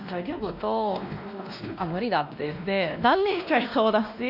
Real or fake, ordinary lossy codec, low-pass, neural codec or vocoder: fake; none; 5.4 kHz; codec, 16 kHz, 1 kbps, X-Codec, WavLM features, trained on Multilingual LibriSpeech